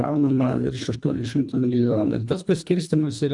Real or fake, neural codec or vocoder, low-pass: fake; codec, 24 kHz, 1.5 kbps, HILCodec; 10.8 kHz